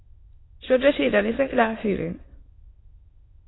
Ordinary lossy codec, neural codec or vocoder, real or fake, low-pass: AAC, 16 kbps; autoencoder, 22.05 kHz, a latent of 192 numbers a frame, VITS, trained on many speakers; fake; 7.2 kHz